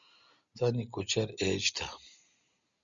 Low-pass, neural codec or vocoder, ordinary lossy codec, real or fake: 7.2 kHz; none; Opus, 64 kbps; real